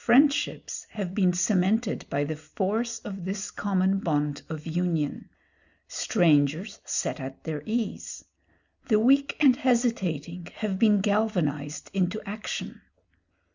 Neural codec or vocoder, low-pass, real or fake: none; 7.2 kHz; real